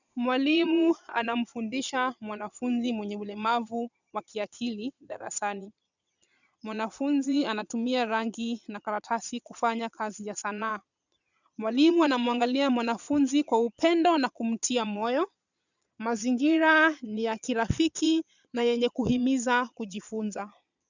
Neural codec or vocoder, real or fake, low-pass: vocoder, 22.05 kHz, 80 mel bands, Vocos; fake; 7.2 kHz